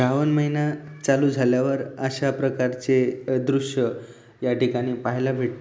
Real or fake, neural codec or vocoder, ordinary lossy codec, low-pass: real; none; none; none